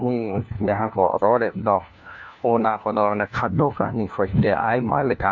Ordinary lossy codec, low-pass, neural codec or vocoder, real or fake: MP3, 48 kbps; 7.2 kHz; codec, 16 kHz, 1 kbps, FunCodec, trained on LibriTTS, 50 frames a second; fake